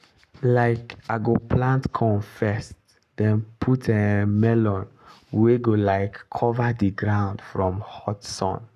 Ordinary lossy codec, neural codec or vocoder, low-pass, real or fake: none; codec, 44.1 kHz, 7.8 kbps, Pupu-Codec; 14.4 kHz; fake